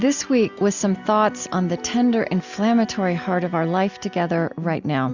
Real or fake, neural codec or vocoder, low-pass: real; none; 7.2 kHz